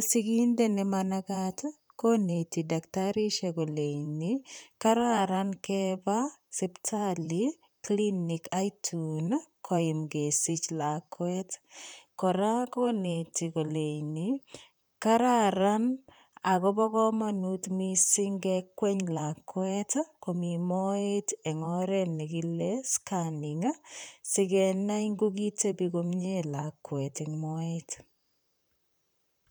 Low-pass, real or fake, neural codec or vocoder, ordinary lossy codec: none; fake; vocoder, 44.1 kHz, 128 mel bands, Pupu-Vocoder; none